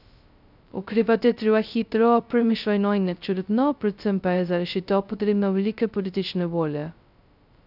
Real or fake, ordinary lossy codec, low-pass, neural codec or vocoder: fake; none; 5.4 kHz; codec, 16 kHz, 0.2 kbps, FocalCodec